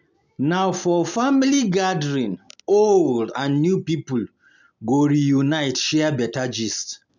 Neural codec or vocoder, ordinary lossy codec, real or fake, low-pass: none; none; real; 7.2 kHz